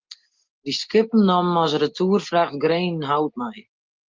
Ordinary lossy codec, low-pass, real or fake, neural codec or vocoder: Opus, 24 kbps; 7.2 kHz; real; none